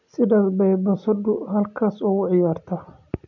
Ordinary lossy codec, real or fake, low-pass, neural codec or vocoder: none; real; 7.2 kHz; none